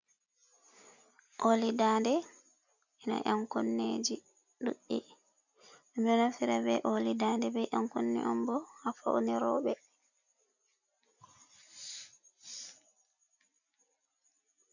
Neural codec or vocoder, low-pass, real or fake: none; 7.2 kHz; real